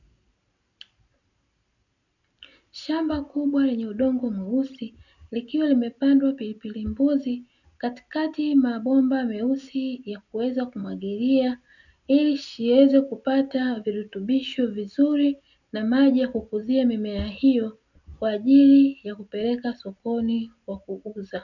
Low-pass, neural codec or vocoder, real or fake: 7.2 kHz; none; real